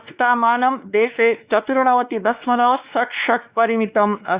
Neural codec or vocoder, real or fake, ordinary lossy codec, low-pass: codec, 16 kHz, 2 kbps, X-Codec, WavLM features, trained on Multilingual LibriSpeech; fake; Opus, 64 kbps; 3.6 kHz